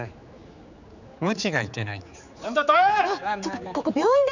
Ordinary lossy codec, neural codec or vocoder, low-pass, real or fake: none; codec, 16 kHz, 4 kbps, X-Codec, HuBERT features, trained on general audio; 7.2 kHz; fake